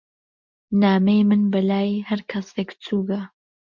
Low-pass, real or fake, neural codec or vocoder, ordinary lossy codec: 7.2 kHz; real; none; MP3, 64 kbps